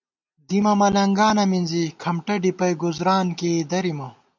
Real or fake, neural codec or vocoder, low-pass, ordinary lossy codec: real; none; 7.2 kHz; MP3, 64 kbps